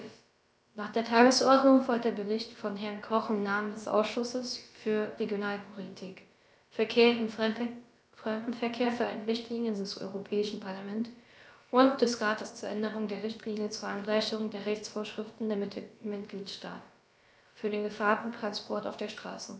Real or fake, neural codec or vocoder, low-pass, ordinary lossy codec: fake; codec, 16 kHz, about 1 kbps, DyCAST, with the encoder's durations; none; none